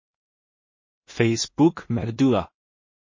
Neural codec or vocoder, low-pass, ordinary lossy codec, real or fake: codec, 16 kHz in and 24 kHz out, 0.4 kbps, LongCat-Audio-Codec, two codebook decoder; 7.2 kHz; MP3, 32 kbps; fake